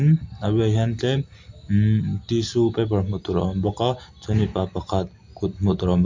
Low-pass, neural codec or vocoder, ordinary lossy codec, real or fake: 7.2 kHz; none; MP3, 48 kbps; real